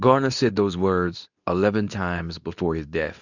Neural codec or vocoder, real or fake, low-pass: codec, 24 kHz, 0.9 kbps, WavTokenizer, medium speech release version 2; fake; 7.2 kHz